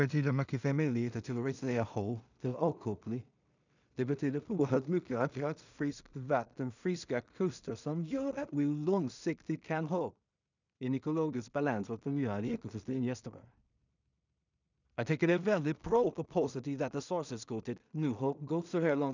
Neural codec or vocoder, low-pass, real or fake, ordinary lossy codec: codec, 16 kHz in and 24 kHz out, 0.4 kbps, LongCat-Audio-Codec, two codebook decoder; 7.2 kHz; fake; none